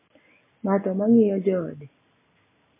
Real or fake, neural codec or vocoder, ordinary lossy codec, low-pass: real; none; MP3, 16 kbps; 3.6 kHz